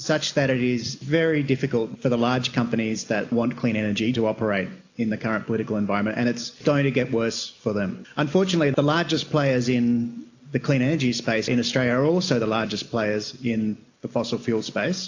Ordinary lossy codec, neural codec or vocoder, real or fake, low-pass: AAC, 48 kbps; none; real; 7.2 kHz